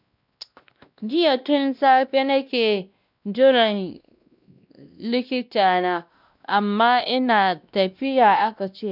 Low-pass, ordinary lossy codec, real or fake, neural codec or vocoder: 5.4 kHz; none; fake; codec, 16 kHz, 1 kbps, X-Codec, WavLM features, trained on Multilingual LibriSpeech